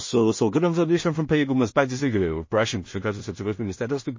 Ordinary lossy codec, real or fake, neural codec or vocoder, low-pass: MP3, 32 kbps; fake; codec, 16 kHz in and 24 kHz out, 0.4 kbps, LongCat-Audio-Codec, two codebook decoder; 7.2 kHz